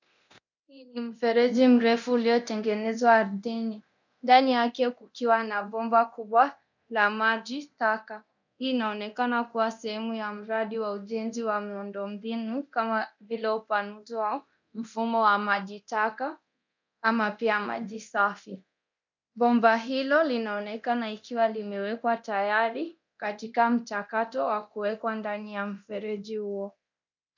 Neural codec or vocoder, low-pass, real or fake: codec, 24 kHz, 0.9 kbps, DualCodec; 7.2 kHz; fake